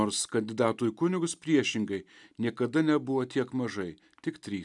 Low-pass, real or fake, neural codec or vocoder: 10.8 kHz; real; none